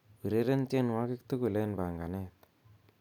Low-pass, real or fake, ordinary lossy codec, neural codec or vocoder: 19.8 kHz; real; none; none